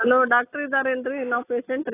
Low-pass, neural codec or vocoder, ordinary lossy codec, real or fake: 3.6 kHz; none; AAC, 16 kbps; real